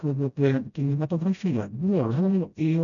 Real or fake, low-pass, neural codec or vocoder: fake; 7.2 kHz; codec, 16 kHz, 0.5 kbps, FreqCodec, smaller model